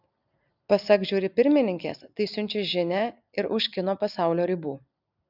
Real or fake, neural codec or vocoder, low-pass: real; none; 5.4 kHz